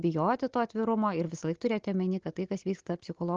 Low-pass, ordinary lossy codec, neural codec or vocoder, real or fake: 7.2 kHz; Opus, 16 kbps; none; real